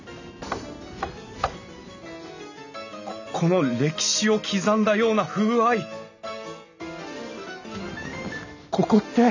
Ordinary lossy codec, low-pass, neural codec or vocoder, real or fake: none; 7.2 kHz; none; real